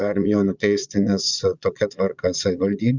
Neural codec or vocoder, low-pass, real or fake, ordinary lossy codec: vocoder, 22.05 kHz, 80 mel bands, Vocos; 7.2 kHz; fake; Opus, 64 kbps